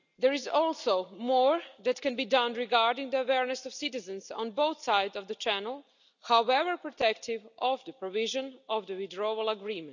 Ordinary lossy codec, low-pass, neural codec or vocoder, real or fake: none; 7.2 kHz; none; real